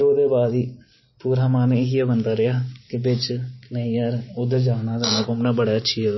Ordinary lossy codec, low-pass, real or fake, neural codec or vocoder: MP3, 24 kbps; 7.2 kHz; real; none